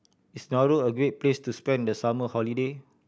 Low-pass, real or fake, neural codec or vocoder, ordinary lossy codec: none; real; none; none